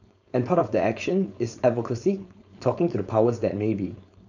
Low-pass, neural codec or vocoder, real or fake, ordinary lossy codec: 7.2 kHz; codec, 16 kHz, 4.8 kbps, FACodec; fake; none